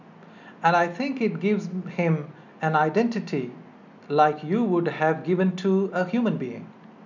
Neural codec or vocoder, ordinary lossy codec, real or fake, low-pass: none; none; real; 7.2 kHz